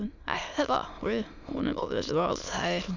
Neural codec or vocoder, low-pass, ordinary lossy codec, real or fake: autoencoder, 22.05 kHz, a latent of 192 numbers a frame, VITS, trained on many speakers; 7.2 kHz; none; fake